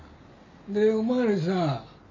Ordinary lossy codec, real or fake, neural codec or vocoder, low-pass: MP3, 32 kbps; real; none; 7.2 kHz